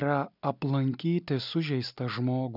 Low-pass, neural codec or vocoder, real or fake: 5.4 kHz; none; real